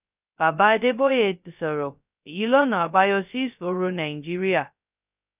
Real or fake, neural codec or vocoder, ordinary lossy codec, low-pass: fake; codec, 16 kHz, 0.2 kbps, FocalCodec; none; 3.6 kHz